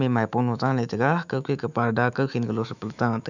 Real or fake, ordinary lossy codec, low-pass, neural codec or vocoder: fake; none; 7.2 kHz; autoencoder, 48 kHz, 128 numbers a frame, DAC-VAE, trained on Japanese speech